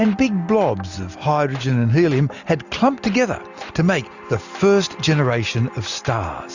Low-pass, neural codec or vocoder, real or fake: 7.2 kHz; none; real